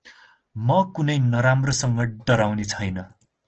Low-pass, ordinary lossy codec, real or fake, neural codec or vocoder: 7.2 kHz; Opus, 16 kbps; real; none